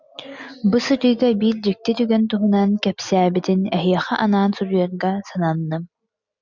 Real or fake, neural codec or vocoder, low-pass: real; none; 7.2 kHz